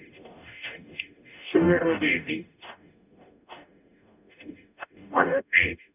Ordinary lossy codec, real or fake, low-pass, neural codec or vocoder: none; fake; 3.6 kHz; codec, 44.1 kHz, 0.9 kbps, DAC